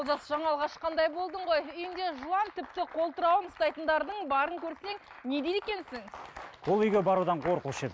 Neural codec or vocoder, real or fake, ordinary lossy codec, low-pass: none; real; none; none